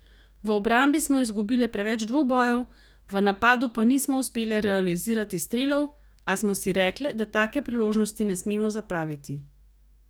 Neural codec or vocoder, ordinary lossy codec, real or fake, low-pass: codec, 44.1 kHz, 2.6 kbps, DAC; none; fake; none